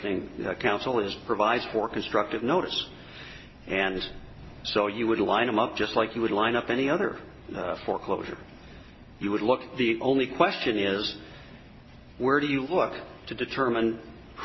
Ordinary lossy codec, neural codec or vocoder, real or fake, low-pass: MP3, 24 kbps; none; real; 7.2 kHz